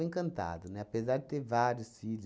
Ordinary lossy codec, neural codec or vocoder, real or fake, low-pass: none; none; real; none